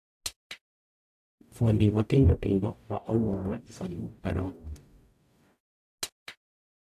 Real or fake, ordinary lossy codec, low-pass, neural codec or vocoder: fake; AAC, 96 kbps; 14.4 kHz; codec, 44.1 kHz, 0.9 kbps, DAC